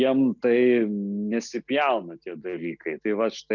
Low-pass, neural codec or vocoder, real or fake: 7.2 kHz; none; real